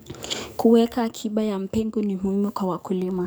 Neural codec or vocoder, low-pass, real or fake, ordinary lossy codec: codec, 44.1 kHz, 7.8 kbps, DAC; none; fake; none